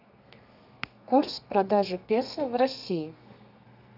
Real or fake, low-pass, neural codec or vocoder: fake; 5.4 kHz; codec, 32 kHz, 1.9 kbps, SNAC